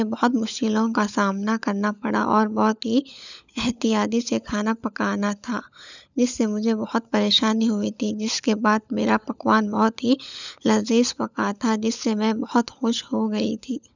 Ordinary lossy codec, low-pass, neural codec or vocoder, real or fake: none; 7.2 kHz; codec, 16 kHz, 16 kbps, FunCodec, trained on LibriTTS, 50 frames a second; fake